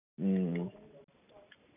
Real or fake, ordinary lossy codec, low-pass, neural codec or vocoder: real; none; 3.6 kHz; none